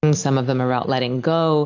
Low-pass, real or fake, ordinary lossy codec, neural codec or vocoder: 7.2 kHz; real; AAC, 48 kbps; none